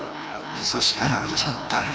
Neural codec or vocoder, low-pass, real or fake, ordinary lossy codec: codec, 16 kHz, 1 kbps, FreqCodec, larger model; none; fake; none